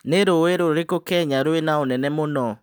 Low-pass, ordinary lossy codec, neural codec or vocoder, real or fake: none; none; none; real